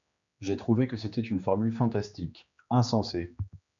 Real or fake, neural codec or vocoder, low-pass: fake; codec, 16 kHz, 2 kbps, X-Codec, HuBERT features, trained on general audio; 7.2 kHz